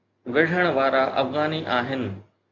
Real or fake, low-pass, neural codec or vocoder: real; 7.2 kHz; none